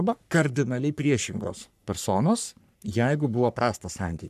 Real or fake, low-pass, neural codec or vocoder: fake; 14.4 kHz; codec, 44.1 kHz, 3.4 kbps, Pupu-Codec